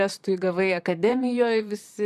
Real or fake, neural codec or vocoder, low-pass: fake; vocoder, 44.1 kHz, 128 mel bands, Pupu-Vocoder; 14.4 kHz